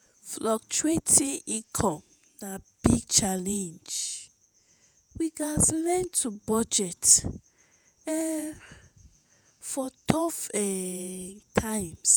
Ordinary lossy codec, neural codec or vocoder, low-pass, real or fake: none; vocoder, 48 kHz, 128 mel bands, Vocos; none; fake